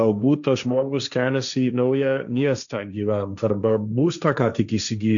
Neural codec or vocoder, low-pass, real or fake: codec, 16 kHz, 1.1 kbps, Voila-Tokenizer; 7.2 kHz; fake